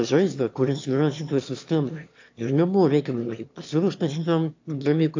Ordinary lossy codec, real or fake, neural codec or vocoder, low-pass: AAC, 48 kbps; fake; autoencoder, 22.05 kHz, a latent of 192 numbers a frame, VITS, trained on one speaker; 7.2 kHz